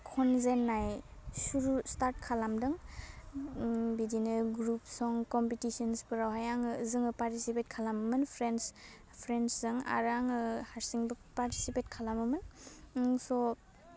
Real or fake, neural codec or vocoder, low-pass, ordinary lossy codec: real; none; none; none